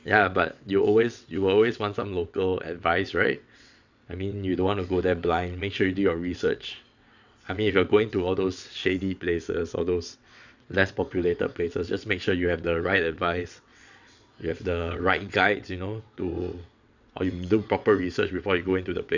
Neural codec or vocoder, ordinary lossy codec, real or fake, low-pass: vocoder, 22.05 kHz, 80 mel bands, WaveNeXt; none; fake; 7.2 kHz